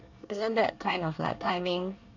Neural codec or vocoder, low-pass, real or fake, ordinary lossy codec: codec, 24 kHz, 1 kbps, SNAC; 7.2 kHz; fake; none